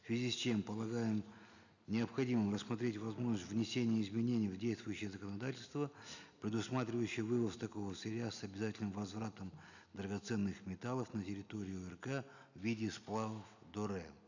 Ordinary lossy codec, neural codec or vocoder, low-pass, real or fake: none; none; 7.2 kHz; real